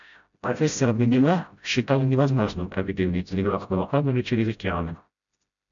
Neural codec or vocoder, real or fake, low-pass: codec, 16 kHz, 0.5 kbps, FreqCodec, smaller model; fake; 7.2 kHz